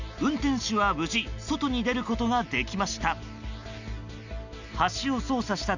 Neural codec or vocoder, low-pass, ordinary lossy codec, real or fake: none; 7.2 kHz; none; real